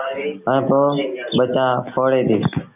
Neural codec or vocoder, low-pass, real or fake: none; 3.6 kHz; real